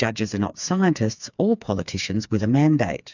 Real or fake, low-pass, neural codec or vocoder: fake; 7.2 kHz; codec, 16 kHz, 4 kbps, FreqCodec, smaller model